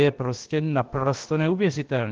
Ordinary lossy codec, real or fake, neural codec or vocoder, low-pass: Opus, 16 kbps; fake; codec, 16 kHz, about 1 kbps, DyCAST, with the encoder's durations; 7.2 kHz